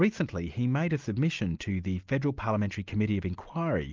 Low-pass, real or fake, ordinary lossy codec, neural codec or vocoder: 7.2 kHz; real; Opus, 32 kbps; none